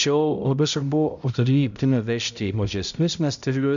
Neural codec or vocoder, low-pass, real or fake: codec, 16 kHz, 0.5 kbps, X-Codec, HuBERT features, trained on balanced general audio; 7.2 kHz; fake